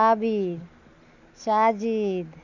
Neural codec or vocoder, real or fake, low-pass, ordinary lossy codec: none; real; 7.2 kHz; none